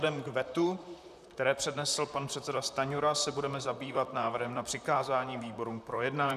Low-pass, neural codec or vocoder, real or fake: 14.4 kHz; vocoder, 44.1 kHz, 128 mel bands, Pupu-Vocoder; fake